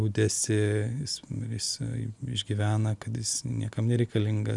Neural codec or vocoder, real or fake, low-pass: none; real; 10.8 kHz